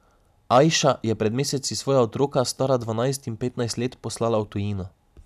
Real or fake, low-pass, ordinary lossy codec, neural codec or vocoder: real; 14.4 kHz; none; none